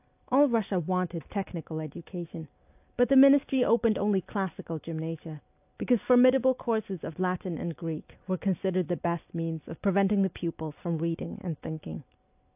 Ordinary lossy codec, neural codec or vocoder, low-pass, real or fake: AAC, 32 kbps; none; 3.6 kHz; real